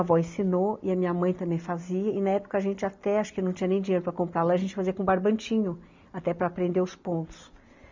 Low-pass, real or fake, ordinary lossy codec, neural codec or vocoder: 7.2 kHz; real; MP3, 64 kbps; none